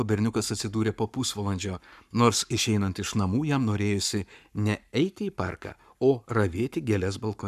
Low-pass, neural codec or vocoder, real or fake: 14.4 kHz; codec, 44.1 kHz, 7.8 kbps, Pupu-Codec; fake